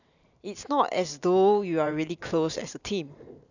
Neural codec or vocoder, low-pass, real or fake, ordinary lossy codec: vocoder, 44.1 kHz, 128 mel bands every 512 samples, BigVGAN v2; 7.2 kHz; fake; none